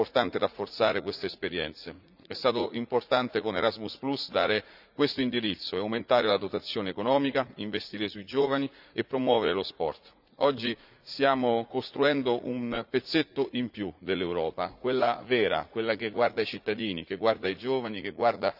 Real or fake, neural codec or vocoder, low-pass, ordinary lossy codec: fake; vocoder, 44.1 kHz, 80 mel bands, Vocos; 5.4 kHz; none